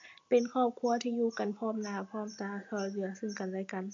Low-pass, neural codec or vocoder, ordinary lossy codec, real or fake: 7.2 kHz; none; AAC, 48 kbps; real